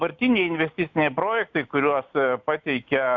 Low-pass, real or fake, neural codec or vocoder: 7.2 kHz; real; none